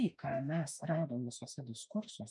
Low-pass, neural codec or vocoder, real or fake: 10.8 kHz; autoencoder, 48 kHz, 32 numbers a frame, DAC-VAE, trained on Japanese speech; fake